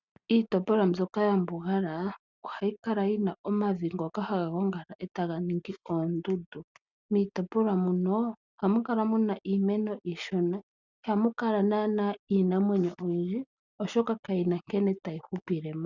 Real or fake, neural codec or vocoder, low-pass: real; none; 7.2 kHz